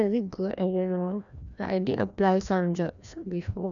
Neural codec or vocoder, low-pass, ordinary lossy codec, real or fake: codec, 16 kHz, 1 kbps, FreqCodec, larger model; 7.2 kHz; none; fake